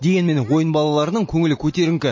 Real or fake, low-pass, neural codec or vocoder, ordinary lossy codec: real; 7.2 kHz; none; MP3, 32 kbps